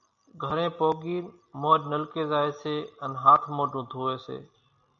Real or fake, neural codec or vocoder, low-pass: real; none; 7.2 kHz